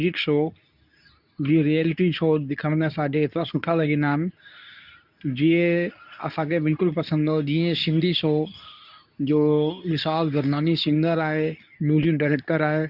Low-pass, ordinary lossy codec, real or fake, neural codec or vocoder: 5.4 kHz; none; fake; codec, 24 kHz, 0.9 kbps, WavTokenizer, medium speech release version 2